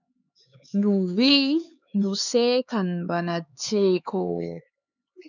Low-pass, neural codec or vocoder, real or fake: 7.2 kHz; codec, 16 kHz, 4 kbps, X-Codec, HuBERT features, trained on LibriSpeech; fake